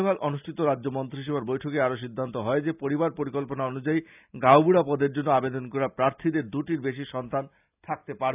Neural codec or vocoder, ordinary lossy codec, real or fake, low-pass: none; none; real; 3.6 kHz